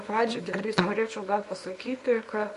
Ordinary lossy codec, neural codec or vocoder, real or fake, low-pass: MP3, 48 kbps; codec, 24 kHz, 0.9 kbps, WavTokenizer, small release; fake; 10.8 kHz